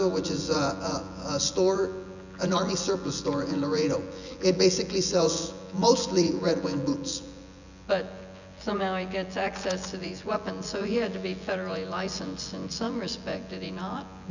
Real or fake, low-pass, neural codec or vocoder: fake; 7.2 kHz; vocoder, 24 kHz, 100 mel bands, Vocos